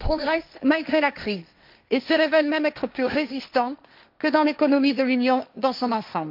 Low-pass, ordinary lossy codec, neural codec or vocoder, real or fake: 5.4 kHz; MP3, 48 kbps; codec, 16 kHz, 1.1 kbps, Voila-Tokenizer; fake